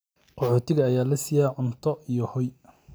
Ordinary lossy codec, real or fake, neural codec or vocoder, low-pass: none; real; none; none